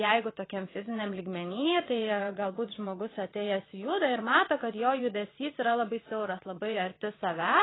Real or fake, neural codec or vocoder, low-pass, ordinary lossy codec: fake; vocoder, 22.05 kHz, 80 mel bands, WaveNeXt; 7.2 kHz; AAC, 16 kbps